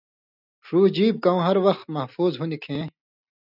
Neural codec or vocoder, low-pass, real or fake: none; 5.4 kHz; real